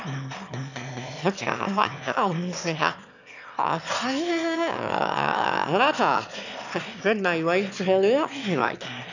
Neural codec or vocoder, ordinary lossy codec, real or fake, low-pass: autoencoder, 22.05 kHz, a latent of 192 numbers a frame, VITS, trained on one speaker; none; fake; 7.2 kHz